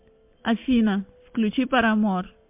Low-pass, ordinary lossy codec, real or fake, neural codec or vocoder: 3.6 kHz; MP3, 32 kbps; real; none